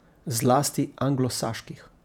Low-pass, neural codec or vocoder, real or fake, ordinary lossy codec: 19.8 kHz; vocoder, 48 kHz, 128 mel bands, Vocos; fake; none